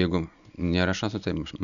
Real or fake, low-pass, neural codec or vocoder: real; 7.2 kHz; none